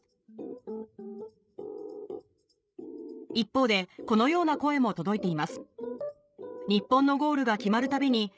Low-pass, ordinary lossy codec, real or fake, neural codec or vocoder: none; none; fake; codec, 16 kHz, 16 kbps, FreqCodec, larger model